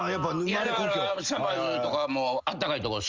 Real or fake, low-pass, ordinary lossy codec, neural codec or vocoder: real; 7.2 kHz; Opus, 32 kbps; none